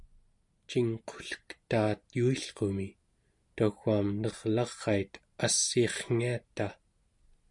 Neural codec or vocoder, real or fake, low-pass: none; real; 10.8 kHz